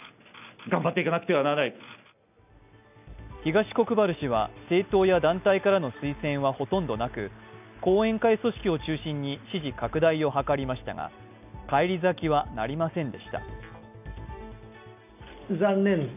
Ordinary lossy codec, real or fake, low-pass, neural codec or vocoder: none; real; 3.6 kHz; none